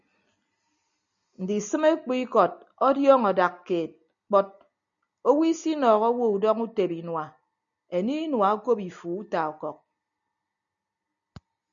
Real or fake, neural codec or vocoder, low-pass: real; none; 7.2 kHz